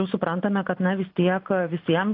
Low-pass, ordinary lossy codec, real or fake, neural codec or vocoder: 5.4 kHz; AAC, 32 kbps; real; none